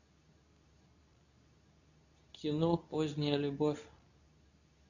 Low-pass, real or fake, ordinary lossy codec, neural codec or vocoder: 7.2 kHz; fake; none; codec, 24 kHz, 0.9 kbps, WavTokenizer, medium speech release version 2